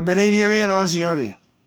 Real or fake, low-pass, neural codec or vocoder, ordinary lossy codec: fake; none; codec, 44.1 kHz, 2.6 kbps, DAC; none